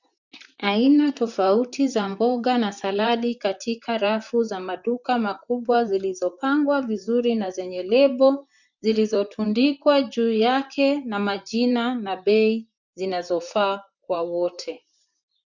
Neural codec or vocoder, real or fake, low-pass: vocoder, 44.1 kHz, 128 mel bands, Pupu-Vocoder; fake; 7.2 kHz